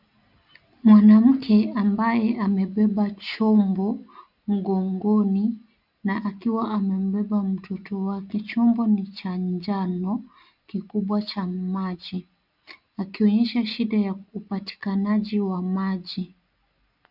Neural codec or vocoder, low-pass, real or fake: none; 5.4 kHz; real